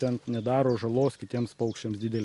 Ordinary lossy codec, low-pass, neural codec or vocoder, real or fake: MP3, 48 kbps; 14.4 kHz; none; real